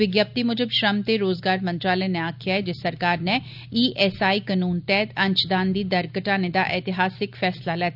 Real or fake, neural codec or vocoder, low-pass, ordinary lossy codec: real; none; 5.4 kHz; none